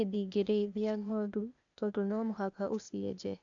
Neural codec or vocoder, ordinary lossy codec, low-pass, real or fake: codec, 16 kHz, 0.8 kbps, ZipCodec; none; 7.2 kHz; fake